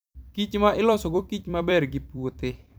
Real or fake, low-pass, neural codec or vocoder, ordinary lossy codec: fake; none; vocoder, 44.1 kHz, 128 mel bands every 256 samples, BigVGAN v2; none